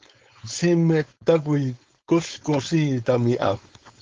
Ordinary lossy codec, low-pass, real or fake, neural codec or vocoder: Opus, 32 kbps; 7.2 kHz; fake; codec, 16 kHz, 4.8 kbps, FACodec